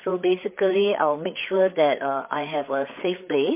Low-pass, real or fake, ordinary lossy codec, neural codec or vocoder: 3.6 kHz; fake; MP3, 24 kbps; codec, 16 kHz, 4 kbps, FreqCodec, larger model